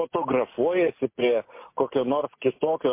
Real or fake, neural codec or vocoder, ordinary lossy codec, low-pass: real; none; MP3, 24 kbps; 3.6 kHz